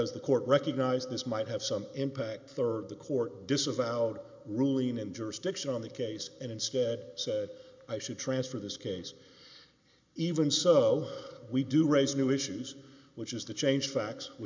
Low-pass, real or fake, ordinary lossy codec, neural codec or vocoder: 7.2 kHz; real; MP3, 64 kbps; none